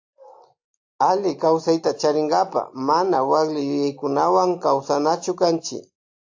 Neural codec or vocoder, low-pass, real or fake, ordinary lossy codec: none; 7.2 kHz; real; AAC, 48 kbps